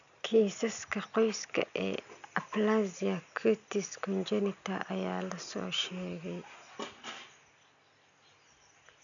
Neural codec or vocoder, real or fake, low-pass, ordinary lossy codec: none; real; 7.2 kHz; none